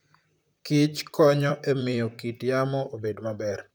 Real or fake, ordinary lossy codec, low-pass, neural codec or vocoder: fake; none; none; vocoder, 44.1 kHz, 128 mel bands, Pupu-Vocoder